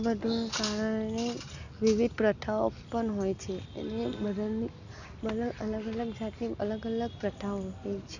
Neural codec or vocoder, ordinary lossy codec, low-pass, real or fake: none; none; 7.2 kHz; real